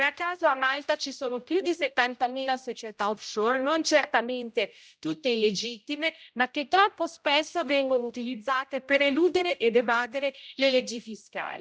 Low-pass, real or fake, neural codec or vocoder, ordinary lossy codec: none; fake; codec, 16 kHz, 0.5 kbps, X-Codec, HuBERT features, trained on general audio; none